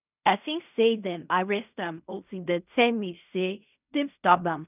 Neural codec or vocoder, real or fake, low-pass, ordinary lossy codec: codec, 16 kHz in and 24 kHz out, 0.4 kbps, LongCat-Audio-Codec, fine tuned four codebook decoder; fake; 3.6 kHz; none